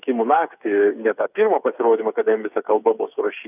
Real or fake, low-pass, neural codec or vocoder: fake; 3.6 kHz; codec, 16 kHz, 8 kbps, FreqCodec, smaller model